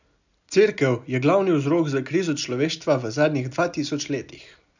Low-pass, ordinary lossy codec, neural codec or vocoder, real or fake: 7.2 kHz; none; none; real